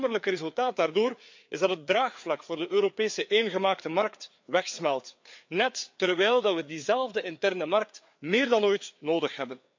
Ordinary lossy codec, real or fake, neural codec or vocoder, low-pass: MP3, 64 kbps; fake; codec, 16 kHz, 4 kbps, FreqCodec, larger model; 7.2 kHz